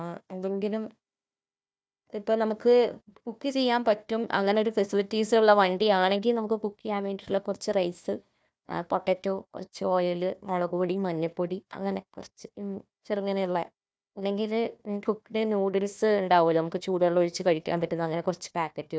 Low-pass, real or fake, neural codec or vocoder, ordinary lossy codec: none; fake; codec, 16 kHz, 1 kbps, FunCodec, trained on Chinese and English, 50 frames a second; none